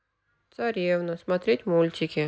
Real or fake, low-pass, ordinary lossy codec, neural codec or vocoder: real; none; none; none